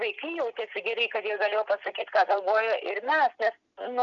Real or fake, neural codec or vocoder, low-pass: real; none; 7.2 kHz